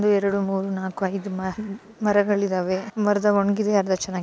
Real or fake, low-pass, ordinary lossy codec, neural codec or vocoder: real; none; none; none